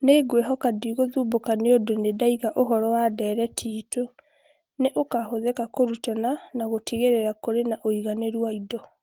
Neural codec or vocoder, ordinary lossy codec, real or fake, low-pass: none; Opus, 24 kbps; real; 19.8 kHz